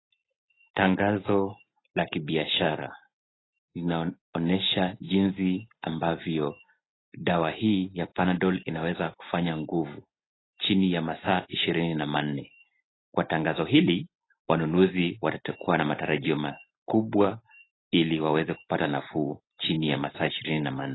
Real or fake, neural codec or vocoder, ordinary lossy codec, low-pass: real; none; AAC, 16 kbps; 7.2 kHz